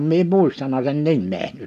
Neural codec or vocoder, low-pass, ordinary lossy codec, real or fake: none; 14.4 kHz; none; real